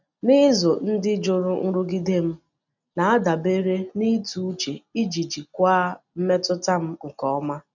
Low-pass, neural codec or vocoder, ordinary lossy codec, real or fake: 7.2 kHz; none; none; real